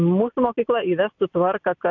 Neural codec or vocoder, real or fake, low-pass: none; real; 7.2 kHz